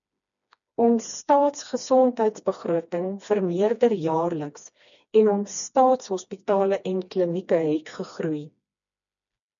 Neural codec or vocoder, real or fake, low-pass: codec, 16 kHz, 2 kbps, FreqCodec, smaller model; fake; 7.2 kHz